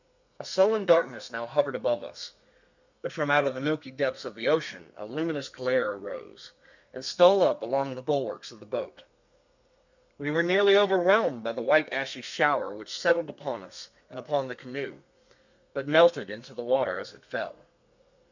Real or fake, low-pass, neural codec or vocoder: fake; 7.2 kHz; codec, 32 kHz, 1.9 kbps, SNAC